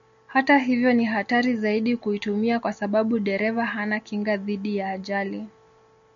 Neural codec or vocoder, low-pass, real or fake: none; 7.2 kHz; real